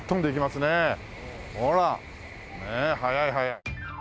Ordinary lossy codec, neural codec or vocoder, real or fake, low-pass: none; none; real; none